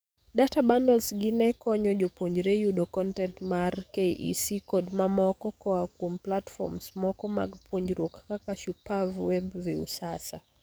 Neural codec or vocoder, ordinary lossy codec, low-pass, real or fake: codec, 44.1 kHz, 7.8 kbps, DAC; none; none; fake